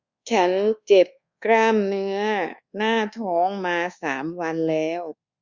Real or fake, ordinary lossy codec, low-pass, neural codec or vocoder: fake; Opus, 64 kbps; 7.2 kHz; codec, 24 kHz, 1.2 kbps, DualCodec